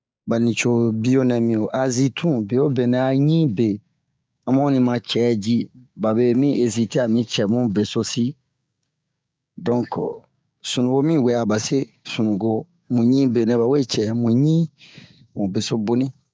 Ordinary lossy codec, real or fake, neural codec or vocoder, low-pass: none; fake; codec, 16 kHz, 6 kbps, DAC; none